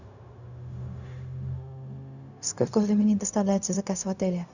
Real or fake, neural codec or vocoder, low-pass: fake; codec, 16 kHz, 0.4 kbps, LongCat-Audio-Codec; 7.2 kHz